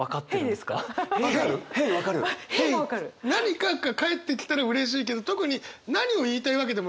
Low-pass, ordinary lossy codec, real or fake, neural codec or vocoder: none; none; real; none